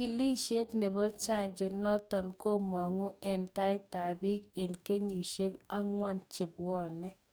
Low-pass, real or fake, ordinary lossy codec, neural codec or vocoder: none; fake; none; codec, 44.1 kHz, 2.6 kbps, DAC